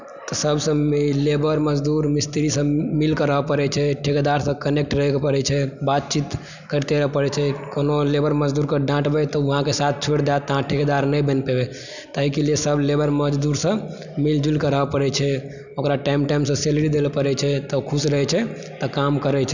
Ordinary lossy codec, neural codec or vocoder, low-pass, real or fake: none; none; 7.2 kHz; real